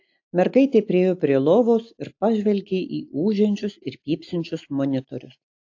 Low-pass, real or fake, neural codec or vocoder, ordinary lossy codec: 7.2 kHz; real; none; AAC, 48 kbps